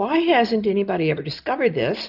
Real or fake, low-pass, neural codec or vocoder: real; 5.4 kHz; none